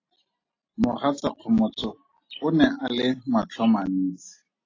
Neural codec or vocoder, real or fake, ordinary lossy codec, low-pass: none; real; AAC, 32 kbps; 7.2 kHz